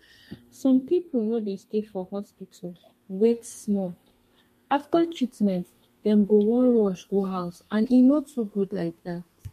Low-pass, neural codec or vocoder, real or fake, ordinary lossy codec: 14.4 kHz; codec, 32 kHz, 1.9 kbps, SNAC; fake; MP3, 64 kbps